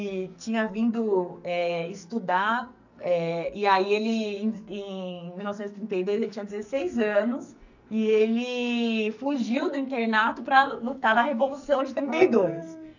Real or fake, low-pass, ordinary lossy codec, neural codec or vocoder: fake; 7.2 kHz; none; codec, 44.1 kHz, 2.6 kbps, SNAC